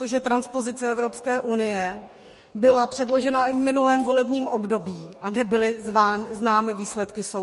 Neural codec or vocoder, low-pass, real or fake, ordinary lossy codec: codec, 44.1 kHz, 2.6 kbps, DAC; 14.4 kHz; fake; MP3, 48 kbps